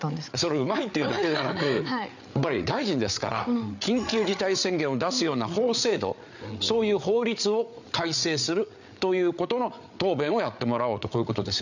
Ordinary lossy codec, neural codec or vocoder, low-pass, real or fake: none; codec, 16 kHz, 8 kbps, FreqCodec, larger model; 7.2 kHz; fake